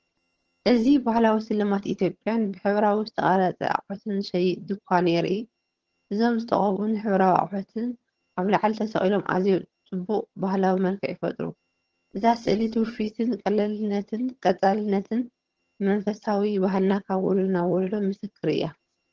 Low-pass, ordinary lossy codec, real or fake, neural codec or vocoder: 7.2 kHz; Opus, 16 kbps; fake; vocoder, 22.05 kHz, 80 mel bands, HiFi-GAN